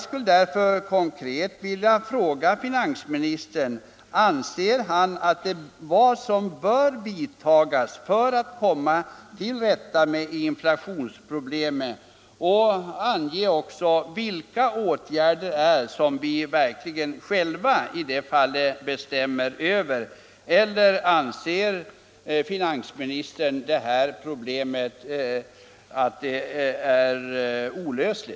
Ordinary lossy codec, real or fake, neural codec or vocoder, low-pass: none; real; none; none